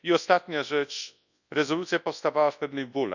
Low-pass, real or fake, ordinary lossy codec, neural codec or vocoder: 7.2 kHz; fake; none; codec, 24 kHz, 0.9 kbps, WavTokenizer, large speech release